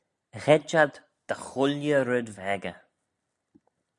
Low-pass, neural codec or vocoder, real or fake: 10.8 kHz; none; real